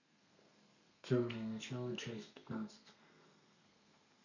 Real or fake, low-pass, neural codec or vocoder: fake; 7.2 kHz; codec, 32 kHz, 1.9 kbps, SNAC